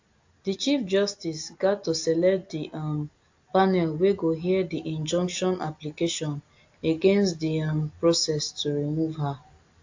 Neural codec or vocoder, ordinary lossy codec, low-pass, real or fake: none; AAC, 48 kbps; 7.2 kHz; real